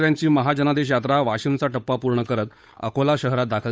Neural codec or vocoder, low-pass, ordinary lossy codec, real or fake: codec, 16 kHz, 8 kbps, FunCodec, trained on Chinese and English, 25 frames a second; none; none; fake